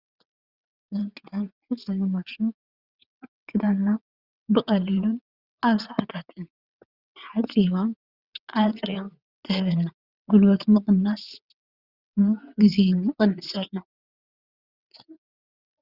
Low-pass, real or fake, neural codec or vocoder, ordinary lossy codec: 5.4 kHz; fake; vocoder, 22.05 kHz, 80 mel bands, WaveNeXt; Opus, 64 kbps